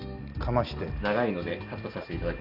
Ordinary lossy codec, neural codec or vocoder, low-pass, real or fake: none; none; 5.4 kHz; real